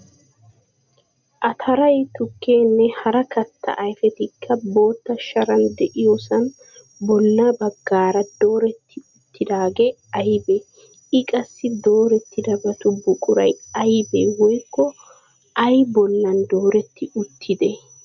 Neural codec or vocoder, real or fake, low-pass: none; real; 7.2 kHz